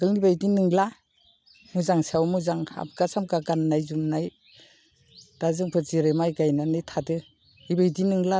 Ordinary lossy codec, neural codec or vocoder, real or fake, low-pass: none; none; real; none